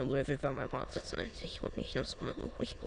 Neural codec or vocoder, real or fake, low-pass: autoencoder, 22.05 kHz, a latent of 192 numbers a frame, VITS, trained on many speakers; fake; 9.9 kHz